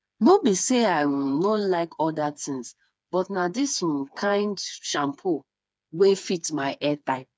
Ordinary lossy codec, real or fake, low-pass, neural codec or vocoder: none; fake; none; codec, 16 kHz, 4 kbps, FreqCodec, smaller model